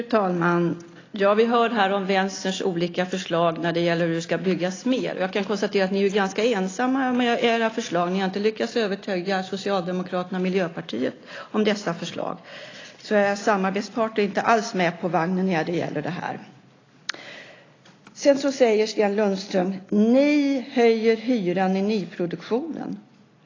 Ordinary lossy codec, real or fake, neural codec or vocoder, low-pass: AAC, 32 kbps; real; none; 7.2 kHz